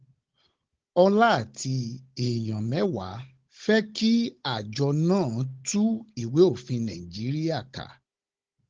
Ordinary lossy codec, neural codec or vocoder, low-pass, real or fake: Opus, 16 kbps; codec, 16 kHz, 16 kbps, FunCodec, trained on Chinese and English, 50 frames a second; 7.2 kHz; fake